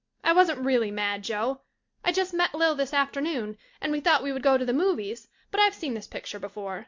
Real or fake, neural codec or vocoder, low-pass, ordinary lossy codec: real; none; 7.2 kHz; MP3, 48 kbps